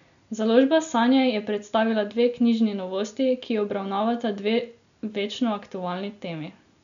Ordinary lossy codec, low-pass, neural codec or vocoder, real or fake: none; 7.2 kHz; none; real